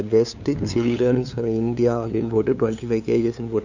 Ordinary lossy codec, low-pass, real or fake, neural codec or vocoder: none; 7.2 kHz; fake; codec, 16 kHz, 2 kbps, FunCodec, trained on LibriTTS, 25 frames a second